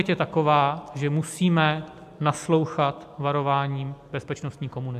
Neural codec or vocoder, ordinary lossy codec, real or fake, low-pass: none; AAC, 96 kbps; real; 14.4 kHz